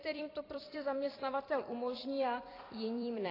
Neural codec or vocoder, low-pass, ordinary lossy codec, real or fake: vocoder, 44.1 kHz, 128 mel bands every 256 samples, BigVGAN v2; 5.4 kHz; AAC, 24 kbps; fake